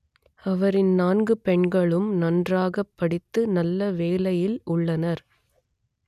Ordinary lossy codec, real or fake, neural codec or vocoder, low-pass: none; real; none; 14.4 kHz